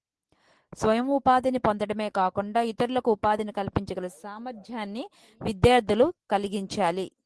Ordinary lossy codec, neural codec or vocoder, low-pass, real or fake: Opus, 16 kbps; none; 10.8 kHz; real